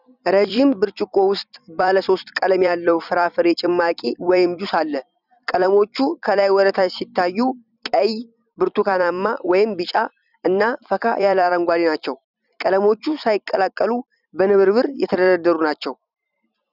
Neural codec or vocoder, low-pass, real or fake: vocoder, 44.1 kHz, 128 mel bands every 256 samples, BigVGAN v2; 5.4 kHz; fake